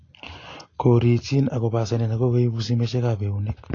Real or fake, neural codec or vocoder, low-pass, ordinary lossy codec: real; none; 7.2 kHz; AAC, 32 kbps